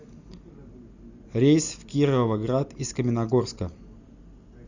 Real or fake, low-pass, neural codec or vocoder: real; 7.2 kHz; none